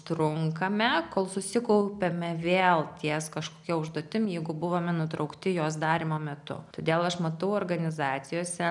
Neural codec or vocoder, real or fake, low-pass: none; real; 10.8 kHz